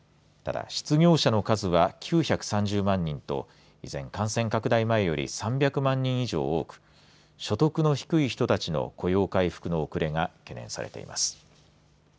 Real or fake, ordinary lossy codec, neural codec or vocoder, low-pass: real; none; none; none